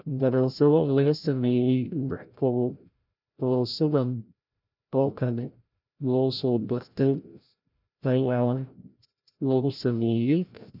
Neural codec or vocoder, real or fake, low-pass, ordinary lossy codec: codec, 16 kHz, 0.5 kbps, FreqCodec, larger model; fake; 5.4 kHz; none